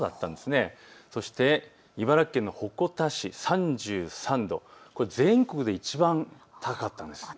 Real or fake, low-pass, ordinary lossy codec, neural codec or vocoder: real; none; none; none